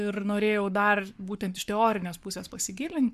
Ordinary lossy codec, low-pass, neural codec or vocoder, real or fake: MP3, 96 kbps; 14.4 kHz; codec, 44.1 kHz, 7.8 kbps, Pupu-Codec; fake